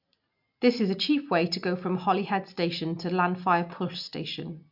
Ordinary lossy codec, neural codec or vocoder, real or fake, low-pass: MP3, 48 kbps; none; real; 5.4 kHz